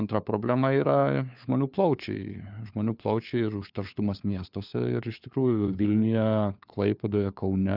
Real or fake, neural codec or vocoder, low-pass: fake; codec, 16 kHz, 4 kbps, FunCodec, trained on LibriTTS, 50 frames a second; 5.4 kHz